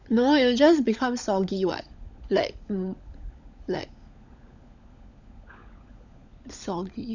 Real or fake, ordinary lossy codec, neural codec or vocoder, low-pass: fake; none; codec, 16 kHz, 16 kbps, FunCodec, trained on LibriTTS, 50 frames a second; 7.2 kHz